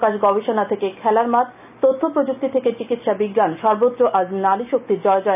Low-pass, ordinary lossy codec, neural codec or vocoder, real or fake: 3.6 kHz; none; none; real